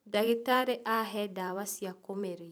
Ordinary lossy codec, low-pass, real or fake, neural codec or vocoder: none; none; fake; vocoder, 44.1 kHz, 128 mel bands every 512 samples, BigVGAN v2